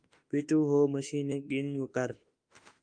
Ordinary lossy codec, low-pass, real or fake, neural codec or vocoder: Opus, 32 kbps; 9.9 kHz; fake; autoencoder, 48 kHz, 32 numbers a frame, DAC-VAE, trained on Japanese speech